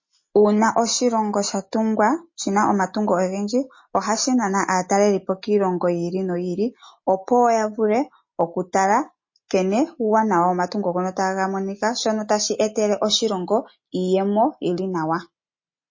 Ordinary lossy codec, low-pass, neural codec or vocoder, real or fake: MP3, 32 kbps; 7.2 kHz; none; real